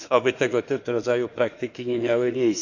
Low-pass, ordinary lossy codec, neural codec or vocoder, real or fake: 7.2 kHz; none; autoencoder, 48 kHz, 32 numbers a frame, DAC-VAE, trained on Japanese speech; fake